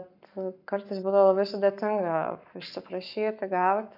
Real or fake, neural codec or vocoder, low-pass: fake; codec, 44.1 kHz, 7.8 kbps, Pupu-Codec; 5.4 kHz